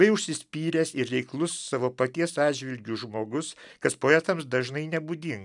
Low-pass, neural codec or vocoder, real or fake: 10.8 kHz; none; real